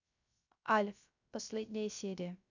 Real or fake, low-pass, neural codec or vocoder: fake; 7.2 kHz; codec, 16 kHz, 0.3 kbps, FocalCodec